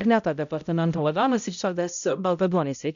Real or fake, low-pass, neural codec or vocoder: fake; 7.2 kHz; codec, 16 kHz, 0.5 kbps, X-Codec, HuBERT features, trained on balanced general audio